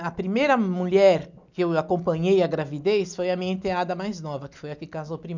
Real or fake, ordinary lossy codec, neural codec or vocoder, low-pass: real; none; none; 7.2 kHz